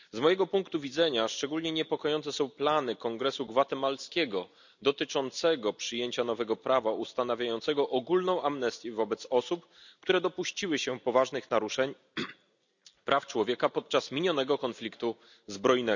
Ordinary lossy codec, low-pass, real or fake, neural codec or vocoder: none; 7.2 kHz; real; none